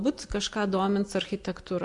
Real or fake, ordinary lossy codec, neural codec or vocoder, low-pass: real; AAC, 64 kbps; none; 10.8 kHz